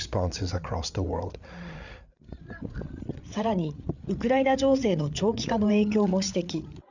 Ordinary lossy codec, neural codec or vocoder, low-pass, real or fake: none; codec, 16 kHz, 8 kbps, FreqCodec, larger model; 7.2 kHz; fake